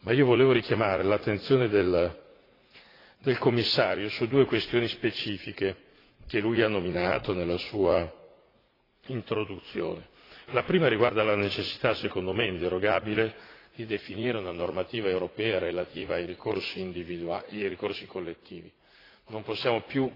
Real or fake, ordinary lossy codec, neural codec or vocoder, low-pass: fake; AAC, 24 kbps; vocoder, 44.1 kHz, 80 mel bands, Vocos; 5.4 kHz